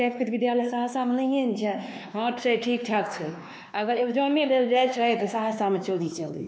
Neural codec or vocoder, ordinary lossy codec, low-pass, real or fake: codec, 16 kHz, 2 kbps, X-Codec, WavLM features, trained on Multilingual LibriSpeech; none; none; fake